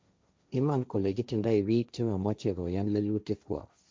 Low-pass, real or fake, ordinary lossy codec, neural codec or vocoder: none; fake; none; codec, 16 kHz, 1.1 kbps, Voila-Tokenizer